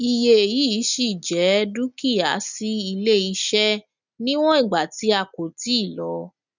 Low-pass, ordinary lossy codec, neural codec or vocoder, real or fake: 7.2 kHz; none; none; real